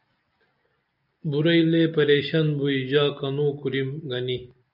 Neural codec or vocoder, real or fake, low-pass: none; real; 5.4 kHz